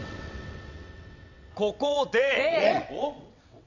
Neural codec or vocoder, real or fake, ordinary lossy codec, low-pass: vocoder, 22.05 kHz, 80 mel bands, WaveNeXt; fake; none; 7.2 kHz